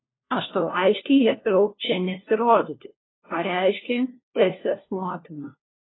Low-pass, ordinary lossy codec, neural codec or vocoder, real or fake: 7.2 kHz; AAC, 16 kbps; codec, 16 kHz, 1 kbps, FunCodec, trained on LibriTTS, 50 frames a second; fake